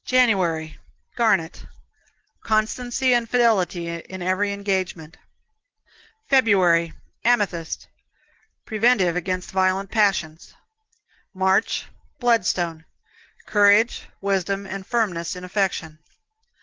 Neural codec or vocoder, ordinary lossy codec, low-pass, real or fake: none; Opus, 16 kbps; 7.2 kHz; real